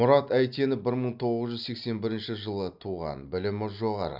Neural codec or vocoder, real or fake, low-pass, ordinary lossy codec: none; real; 5.4 kHz; none